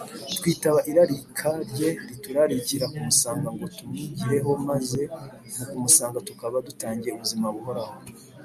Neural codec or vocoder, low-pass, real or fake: none; 14.4 kHz; real